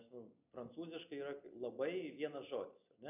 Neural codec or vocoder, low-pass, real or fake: none; 3.6 kHz; real